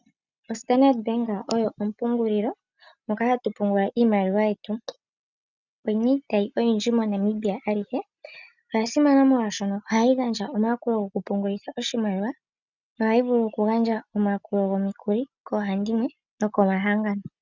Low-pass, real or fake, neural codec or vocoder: 7.2 kHz; real; none